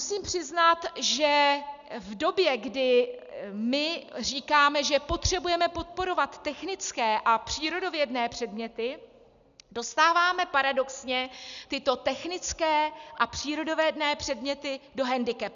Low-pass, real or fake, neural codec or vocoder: 7.2 kHz; real; none